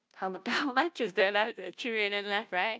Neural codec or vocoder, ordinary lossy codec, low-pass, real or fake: codec, 16 kHz, 0.5 kbps, FunCodec, trained on Chinese and English, 25 frames a second; none; none; fake